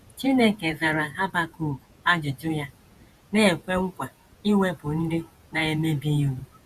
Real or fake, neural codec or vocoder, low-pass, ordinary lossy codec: fake; vocoder, 48 kHz, 128 mel bands, Vocos; 14.4 kHz; Opus, 64 kbps